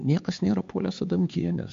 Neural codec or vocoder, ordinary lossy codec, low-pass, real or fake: codec, 16 kHz, 4 kbps, X-Codec, HuBERT features, trained on LibriSpeech; MP3, 48 kbps; 7.2 kHz; fake